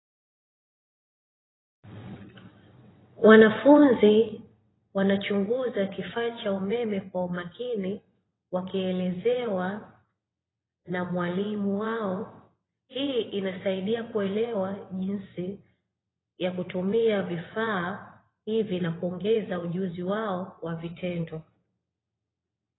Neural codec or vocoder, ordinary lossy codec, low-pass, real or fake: vocoder, 22.05 kHz, 80 mel bands, WaveNeXt; AAC, 16 kbps; 7.2 kHz; fake